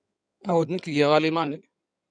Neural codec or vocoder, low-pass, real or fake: codec, 16 kHz in and 24 kHz out, 2.2 kbps, FireRedTTS-2 codec; 9.9 kHz; fake